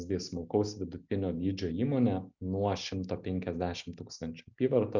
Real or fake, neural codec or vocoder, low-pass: real; none; 7.2 kHz